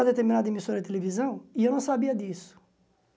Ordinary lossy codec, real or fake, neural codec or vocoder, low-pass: none; real; none; none